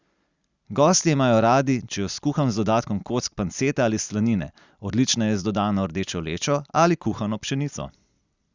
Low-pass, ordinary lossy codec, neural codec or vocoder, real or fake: 7.2 kHz; Opus, 64 kbps; none; real